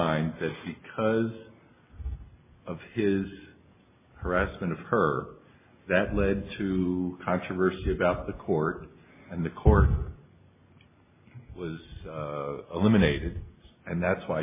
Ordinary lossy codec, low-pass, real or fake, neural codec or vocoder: MP3, 16 kbps; 3.6 kHz; real; none